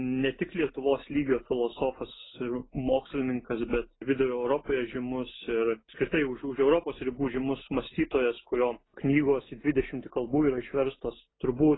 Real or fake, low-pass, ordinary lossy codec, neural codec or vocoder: real; 7.2 kHz; AAC, 16 kbps; none